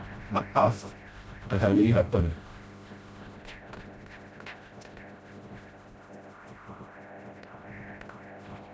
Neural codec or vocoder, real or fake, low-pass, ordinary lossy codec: codec, 16 kHz, 0.5 kbps, FreqCodec, smaller model; fake; none; none